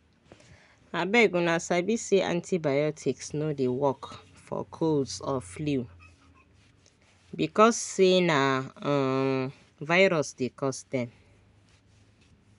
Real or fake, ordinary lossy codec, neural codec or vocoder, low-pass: real; none; none; 10.8 kHz